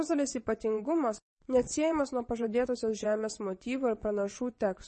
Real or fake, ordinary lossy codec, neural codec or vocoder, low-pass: fake; MP3, 32 kbps; vocoder, 22.05 kHz, 80 mel bands, WaveNeXt; 9.9 kHz